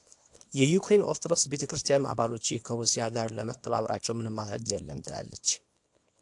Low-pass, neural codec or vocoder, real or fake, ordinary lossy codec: 10.8 kHz; codec, 24 kHz, 0.9 kbps, WavTokenizer, small release; fake; AAC, 64 kbps